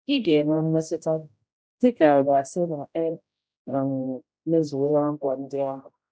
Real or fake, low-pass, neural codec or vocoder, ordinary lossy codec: fake; none; codec, 16 kHz, 0.5 kbps, X-Codec, HuBERT features, trained on general audio; none